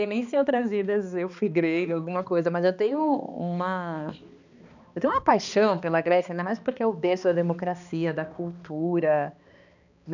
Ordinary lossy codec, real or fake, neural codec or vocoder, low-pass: none; fake; codec, 16 kHz, 2 kbps, X-Codec, HuBERT features, trained on balanced general audio; 7.2 kHz